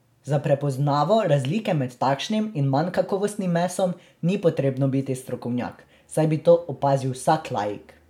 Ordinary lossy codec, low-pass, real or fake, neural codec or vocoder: MP3, 96 kbps; 19.8 kHz; real; none